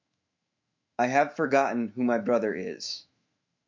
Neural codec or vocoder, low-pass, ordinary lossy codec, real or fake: autoencoder, 48 kHz, 128 numbers a frame, DAC-VAE, trained on Japanese speech; 7.2 kHz; MP3, 64 kbps; fake